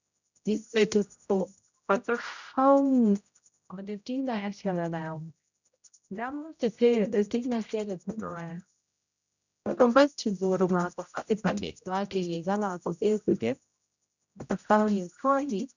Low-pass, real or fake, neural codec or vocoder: 7.2 kHz; fake; codec, 16 kHz, 0.5 kbps, X-Codec, HuBERT features, trained on general audio